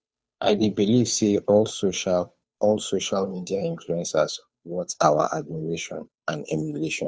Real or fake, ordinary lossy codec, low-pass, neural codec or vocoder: fake; none; none; codec, 16 kHz, 2 kbps, FunCodec, trained on Chinese and English, 25 frames a second